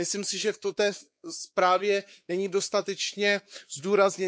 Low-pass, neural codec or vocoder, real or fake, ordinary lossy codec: none; codec, 16 kHz, 4 kbps, X-Codec, WavLM features, trained on Multilingual LibriSpeech; fake; none